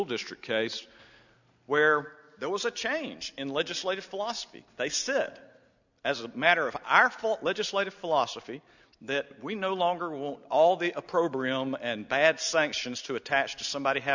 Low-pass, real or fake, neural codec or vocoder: 7.2 kHz; real; none